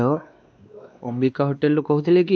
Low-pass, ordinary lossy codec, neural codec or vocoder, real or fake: none; none; codec, 16 kHz, 2 kbps, X-Codec, WavLM features, trained on Multilingual LibriSpeech; fake